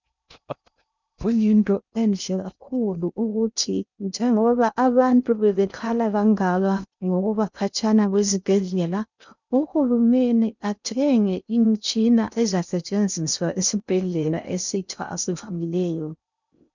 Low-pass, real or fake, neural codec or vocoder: 7.2 kHz; fake; codec, 16 kHz in and 24 kHz out, 0.6 kbps, FocalCodec, streaming, 2048 codes